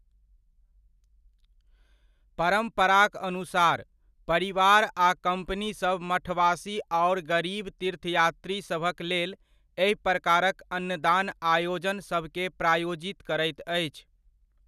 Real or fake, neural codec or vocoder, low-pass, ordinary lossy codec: real; none; 14.4 kHz; none